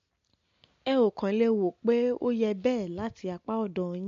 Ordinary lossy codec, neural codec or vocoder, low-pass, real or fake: MP3, 48 kbps; none; 7.2 kHz; real